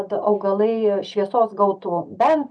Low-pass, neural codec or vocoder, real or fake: 9.9 kHz; none; real